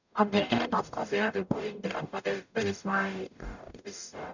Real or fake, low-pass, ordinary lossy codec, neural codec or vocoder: fake; 7.2 kHz; none; codec, 44.1 kHz, 0.9 kbps, DAC